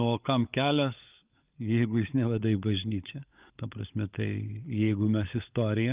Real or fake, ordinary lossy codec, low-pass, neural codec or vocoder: fake; Opus, 24 kbps; 3.6 kHz; codec, 16 kHz, 16 kbps, FunCodec, trained on LibriTTS, 50 frames a second